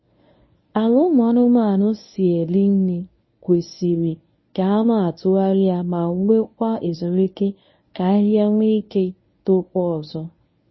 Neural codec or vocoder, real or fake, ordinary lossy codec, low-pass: codec, 24 kHz, 0.9 kbps, WavTokenizer, medium speech release version 1; fake; MP3, 24 kbps; 7.2 kHz